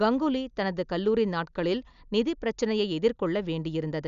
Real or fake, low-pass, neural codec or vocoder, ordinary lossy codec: real; 7.2 kHz; none; none